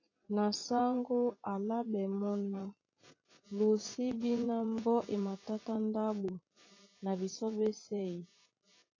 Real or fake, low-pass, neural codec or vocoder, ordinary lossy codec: fake; 7.2 kHz; vocoder, 44.1 kHz, 80 mel bands, Vocos; AAC, 32 kbps